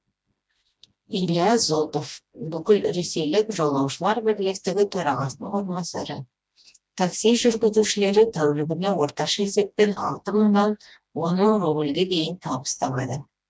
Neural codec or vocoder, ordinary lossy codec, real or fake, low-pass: codec, 16 kHz, 1 kbps, FreqCodec, smaller model; none; fake; none